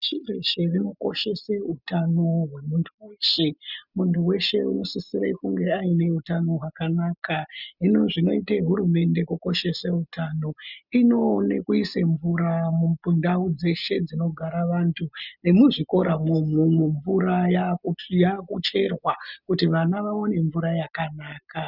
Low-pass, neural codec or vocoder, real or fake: 5.4 kHz; none; real